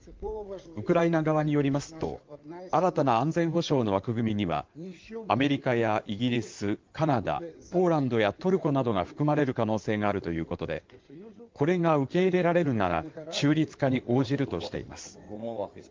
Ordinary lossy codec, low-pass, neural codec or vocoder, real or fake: Opus, 32 kbps; 7.2 kHz; codec, 16 kHz in and 24 kHz out, 2.2 kbps, FireRedTTS-2 codec; fake